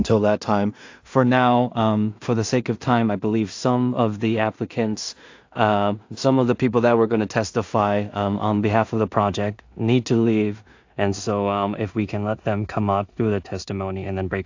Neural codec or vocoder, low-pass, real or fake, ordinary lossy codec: codec, 16 kHz in and 24 kHz out, 0.4 kbps, LongCat-Audio-Codec, two codebook decoder; 7.2 kHz; fake; AAC, 48 kbps